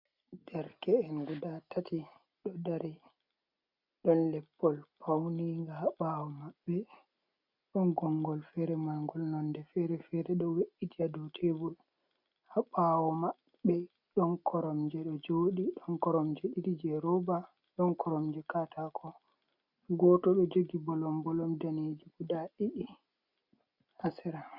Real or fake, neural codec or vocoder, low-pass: real; none; 5.4 kHz